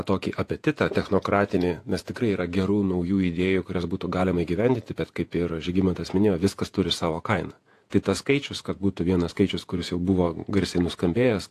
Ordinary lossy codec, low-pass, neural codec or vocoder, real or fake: AAC, 64 kbps; 14.4 kHz; vocoder, 48 kHz, 128 mel bands, Vocos; fake